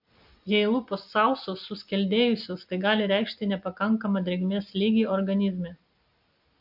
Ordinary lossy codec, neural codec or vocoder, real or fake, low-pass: AAC, 48 kbps; none; real; 5.4 kHz